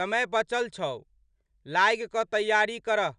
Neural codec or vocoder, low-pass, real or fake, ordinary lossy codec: none; 9.9 kHz; real; none